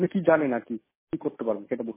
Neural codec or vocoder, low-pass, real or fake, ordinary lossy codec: none; 3.6 kHz; real; MP3, 16 kbps